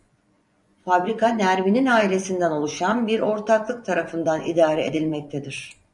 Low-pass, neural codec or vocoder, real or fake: 10.8 kHz; vocoder, 24 kHz, 100 mel bands, Vocos; fake